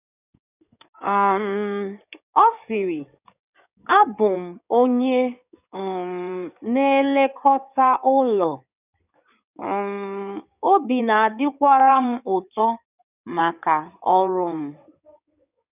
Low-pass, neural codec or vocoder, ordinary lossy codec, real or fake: 3.6 kHz; codec, 16 kHz in and 24 kHz out, 2.2 kbps, FireRedTTS-2 codec; none; fake